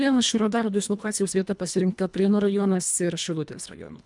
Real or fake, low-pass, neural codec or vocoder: fake; 10.8 kHz; codec, 24 kHz, 1.5 kbps, HILCodec